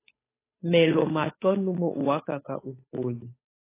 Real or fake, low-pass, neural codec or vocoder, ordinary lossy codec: fake; 3.6 kHz; codec, 16 kHz, 8 kbps, FunCodec, trained on Chinese and English, 25 frames a second; AAC, 16 kbps